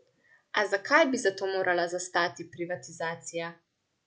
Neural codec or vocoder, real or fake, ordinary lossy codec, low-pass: none; real; none; none